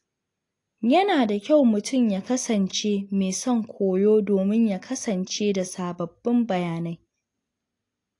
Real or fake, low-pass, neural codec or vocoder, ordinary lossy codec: real; 10.8 kHz; none; AAC, 48 kbps